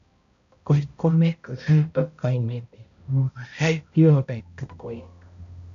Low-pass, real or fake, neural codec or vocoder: 7.2 kHz; fake; codec, 16 kHz, 0.5 kbps, X-Codec, HuBERT features, trained on balanced general audio